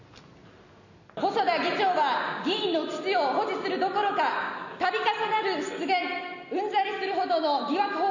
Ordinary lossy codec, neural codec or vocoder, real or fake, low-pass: none; none; real; 7.2 kHz